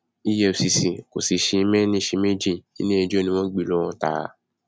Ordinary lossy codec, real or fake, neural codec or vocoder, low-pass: none; real; none; none